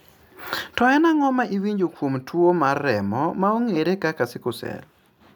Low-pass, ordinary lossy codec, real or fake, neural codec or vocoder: none; none; real; none